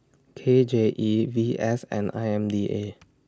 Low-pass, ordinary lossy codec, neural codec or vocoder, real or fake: none; none; none; real